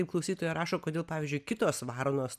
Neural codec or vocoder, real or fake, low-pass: none; real; 14.4 kHz